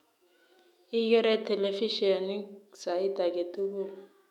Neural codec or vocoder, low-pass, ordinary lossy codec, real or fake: autoencoder, 48 kHz, 128 numbers a frame, DAC-VAE, trained on Japanese speech; 19.8 kHz; MP3, 96 kbps; fake